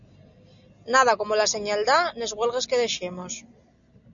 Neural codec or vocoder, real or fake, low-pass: none; real; 7.2 kHz